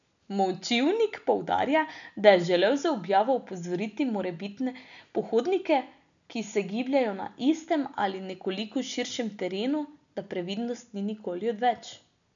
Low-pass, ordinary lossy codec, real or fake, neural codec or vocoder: 7.2 kHz; none; real; none